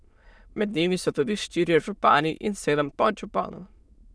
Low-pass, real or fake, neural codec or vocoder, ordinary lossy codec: none; fake; autoencoder, 22.05 kHz, a latent of 192 numbers a frame, VITS, trained on many speakers; none